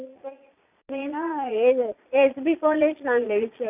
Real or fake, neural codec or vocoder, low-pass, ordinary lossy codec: fake; vocoder, 22.05 kHz, 80 mel bands, Vocos; 3.6 kHz; none